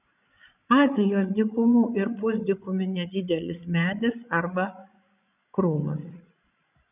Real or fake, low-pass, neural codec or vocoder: fake; 3.6 kHz; codec, 16 kHz, 16 kbps, FreqCodec, larger model